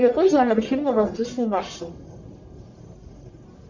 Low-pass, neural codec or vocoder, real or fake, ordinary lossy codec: 7.2 kHz; codec, 44.1 kHz, 1.7 kbps, Pupu-Codec; fake; Opus, 64 kbps